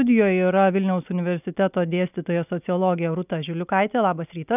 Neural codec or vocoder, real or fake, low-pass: none; real; 3.6 kHz